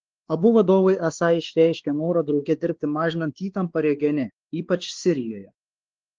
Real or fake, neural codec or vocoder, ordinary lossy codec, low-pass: fake; codec, 16 kHz, 2 kbps, X-Codec, WavLM features, trained on Multilingual LibriSpeech; Opus, 16 kbps; 7.2 kHz